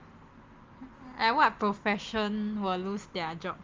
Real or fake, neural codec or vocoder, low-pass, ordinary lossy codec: real; none; 7.2 kHz; Opus, 32 kbps